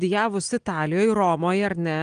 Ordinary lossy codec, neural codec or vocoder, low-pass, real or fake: Opus, 24 kbps; none; 9.9 kHz; real